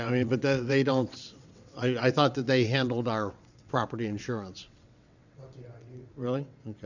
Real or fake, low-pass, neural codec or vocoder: fake; 7.2 kHz; vocoder, 22.05 kHz, 80 mel bands, WaveNeXt